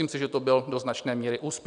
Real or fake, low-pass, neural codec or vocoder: real; 9.9 kHz; none